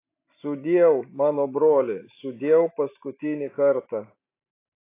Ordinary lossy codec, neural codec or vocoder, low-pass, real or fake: AAC, 24 kbps; none; 3.6 kHz; real